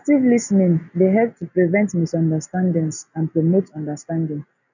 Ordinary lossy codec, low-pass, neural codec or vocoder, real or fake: none; 7.2 kHz; none; real